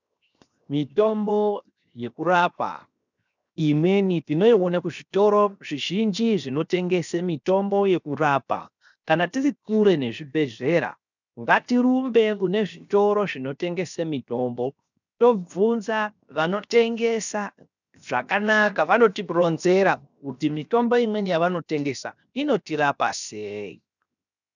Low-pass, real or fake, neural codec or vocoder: 7.2 kHz; fake; codec, 16 kHz, 0.7 kbps, FocalCodec